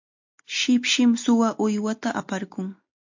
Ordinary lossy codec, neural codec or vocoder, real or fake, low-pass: MP3, 48 kbps; none; real; 7.2 kHz